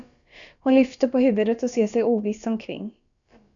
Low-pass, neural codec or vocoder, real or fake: 7.2 kHz; codec, 16 kHz, about 1 kbps, DyCAST, with the encoder's durations; fake